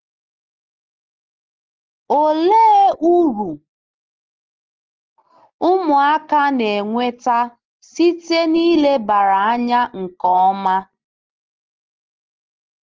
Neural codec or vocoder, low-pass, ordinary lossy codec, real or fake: none; 7.2 kHz; Opus, 16 kbps; real